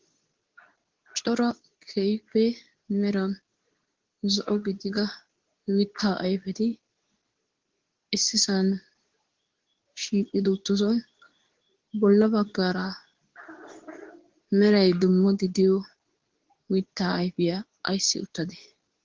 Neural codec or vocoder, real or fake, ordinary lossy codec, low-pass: codec, 24 kHz, 0.9 kbps, WavTokenizer, medium speech release version 2; fake; Opus, 16 kbps; 7.2 kHz